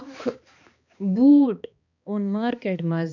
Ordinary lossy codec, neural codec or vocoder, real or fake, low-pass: none; codec, 16 kHz, 2 kbps, X-Codec, HuBERT features, trained on balanced general audio; fake; 7.2 kHz